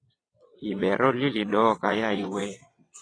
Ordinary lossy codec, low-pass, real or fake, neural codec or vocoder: AAC, 32 kbps; 9.9 kHz; fake; vocoder, 22.05 kHz, 80 mel bands, WaveNeXt